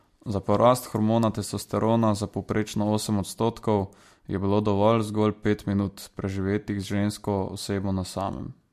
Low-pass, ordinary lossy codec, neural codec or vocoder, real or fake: 14.4 kHz; MP3, 64 kbps; none; real